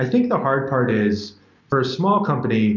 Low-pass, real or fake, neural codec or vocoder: 7.2 kHz; real; none